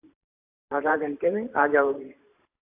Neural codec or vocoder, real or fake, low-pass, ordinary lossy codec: vocoder, 44.1 kHz, 128 mel bands every 512 samples, BigVGAN v2; fake; 3.6 kHz; AAC, 24 kbps